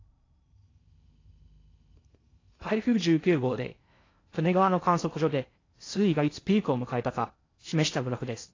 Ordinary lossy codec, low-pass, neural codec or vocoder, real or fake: AAC, 32 kbps; 7.2 kHz; codec, 16 kHz in and 24 kHz out, 0.6 kbps, FocalCodec, streaming, 4096 codes; fake